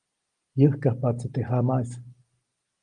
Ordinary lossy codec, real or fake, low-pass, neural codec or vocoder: Opus, 24 kbps; real; 9.9 kHz; none